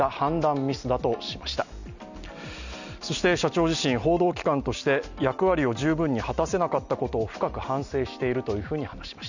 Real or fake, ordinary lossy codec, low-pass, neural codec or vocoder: real; none; 7.2 kHz; none